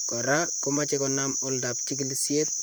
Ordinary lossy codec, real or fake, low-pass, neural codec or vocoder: none; fake; none; vocoder, 44.1 kHz, 128 mel bands, Pupu-Vocoder